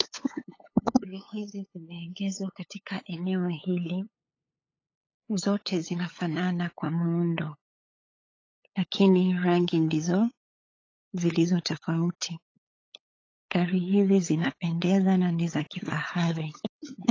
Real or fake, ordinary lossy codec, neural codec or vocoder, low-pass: fake; AAC, 32 kbps; codec, 16 kHz, 8 kbps, FunCodec, trained on LibriTTS, 25 frames a second; 7.2 kHz